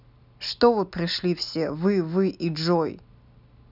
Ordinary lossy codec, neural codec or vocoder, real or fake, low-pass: none; none; real; 5.4 kHz